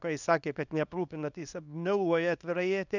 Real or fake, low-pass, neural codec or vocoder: fake; 7.2 kHz; codec, 24 kHz, 0.9 kbps, WavTokenizer, small release